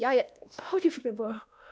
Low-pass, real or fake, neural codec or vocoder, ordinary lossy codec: none; fake; codec, 16 kHz, 1 kbps, X-Codec, WavLM features, trained on Multilingual LibriSpeech; none